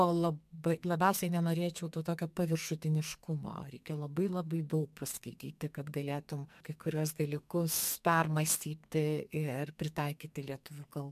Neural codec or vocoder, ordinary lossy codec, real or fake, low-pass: codec, 44.1 kHz, 2.6 kbps, SNAC; MP3, 96 kbps; fake; 14.4 kHz